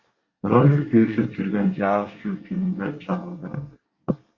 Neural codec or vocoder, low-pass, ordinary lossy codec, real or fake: codec, 24 kHz, 1 kbps, SNAC; 7.2 kHz; Opus, 64 kbps; fake